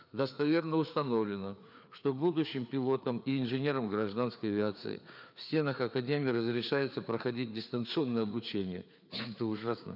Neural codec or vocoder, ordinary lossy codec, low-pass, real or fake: codec, 16 kHz, 4 kbps, FreqCodec, larger model; none; 5.4 kHz; fake